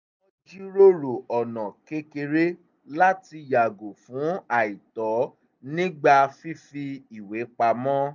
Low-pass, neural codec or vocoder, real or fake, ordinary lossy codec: 7.2 kHz; none; real; none